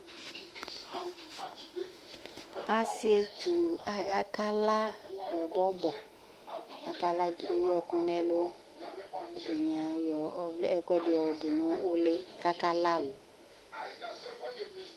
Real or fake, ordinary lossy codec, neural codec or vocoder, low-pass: fake; Opus, 32 kbps; autoencoder, 48 kHz, 32 numbers a frame, DAC-VAE, trained on Japanese speech; 14.4 kHz